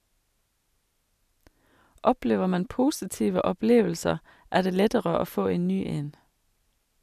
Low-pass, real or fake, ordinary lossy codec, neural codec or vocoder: 14.4 kHz; real; none; none